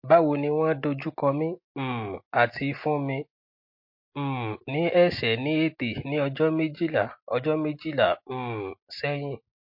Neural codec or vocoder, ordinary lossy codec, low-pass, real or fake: none; MP3, 32 kbps; 5.4 kHz; real